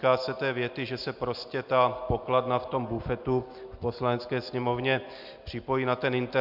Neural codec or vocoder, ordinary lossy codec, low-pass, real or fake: none; MP3, 48 kbps; 5.4 kHz; real